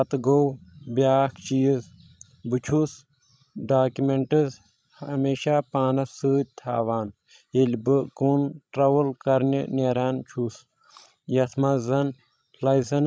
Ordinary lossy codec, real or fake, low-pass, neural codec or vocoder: none; fake; none; codec, 16 kHz, 16 kbps, FreqCodec, larger model